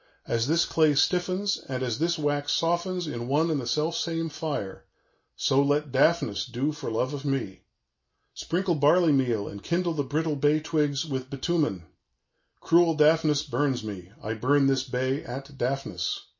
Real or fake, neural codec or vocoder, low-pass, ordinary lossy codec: real; none; 7.2 kHz; MP3, 32 kbps